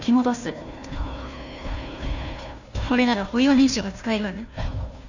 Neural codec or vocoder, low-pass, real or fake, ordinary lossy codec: codec, 16 kHz, 1 kbps, FunCodec, trained on Chinese and English, 50 frames a second; 7.2 kHz; fake; none